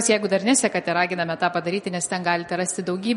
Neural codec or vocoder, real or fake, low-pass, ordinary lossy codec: none; real; 19.8 kHz; MP3, 48 kbps